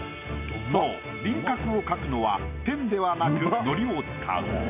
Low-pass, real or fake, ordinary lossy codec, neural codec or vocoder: 3.6 kHz; real; none; none